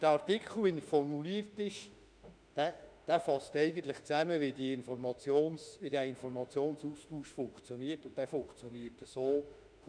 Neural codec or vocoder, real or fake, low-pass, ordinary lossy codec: autoencoder, 48 kHz, 32 numbers a frame, DAC-VAE, trained on Japanese speech; fake; 9.9 kHz; none